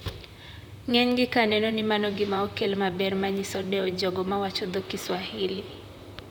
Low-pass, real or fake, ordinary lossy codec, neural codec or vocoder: 19.8 kHz; fake; none; vocoder, 44.1 kHz, 128 mel bands, Pupu-Vocoder